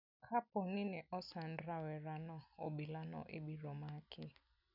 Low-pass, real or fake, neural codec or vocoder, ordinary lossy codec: 5.4 kHz; real; none; none